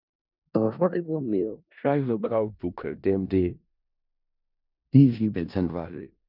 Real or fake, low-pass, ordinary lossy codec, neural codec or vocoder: fake; 5.4 kHz; none; codec, 16 kHz in and 24 kHz out, 0.4 kbps, LongCat-Audio-Codec, four codebook decoder